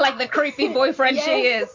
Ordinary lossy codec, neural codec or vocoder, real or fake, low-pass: MP3, 64 kbps; none; real; 7.2 kHz